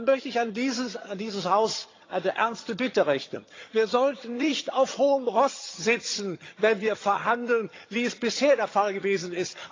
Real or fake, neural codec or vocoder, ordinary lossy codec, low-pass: fake; vocoder, 22.05 kHz, 80 mel bands, HiFi-GAN; AAC, 32 kbps; 7.2 kHz